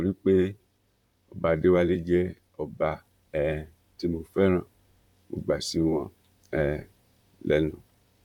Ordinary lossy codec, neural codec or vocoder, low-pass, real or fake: none; vocoder, 44.1 kHz, 128 mel bands, Pupu-Vocoder; 19.8 kHz; fake